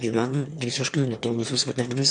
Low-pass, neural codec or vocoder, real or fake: 9.9 kHz; autoencoder, 22.05 kHz, a latent of 192 numbers a frame, VITS, trained on one speaker; fake